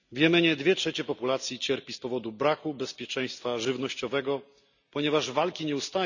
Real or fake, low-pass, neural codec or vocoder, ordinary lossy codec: real; 7.2 kHz; none; none